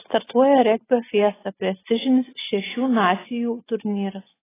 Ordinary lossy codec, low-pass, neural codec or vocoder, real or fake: AAC, 16 kbps; 3.6 kHz; none; real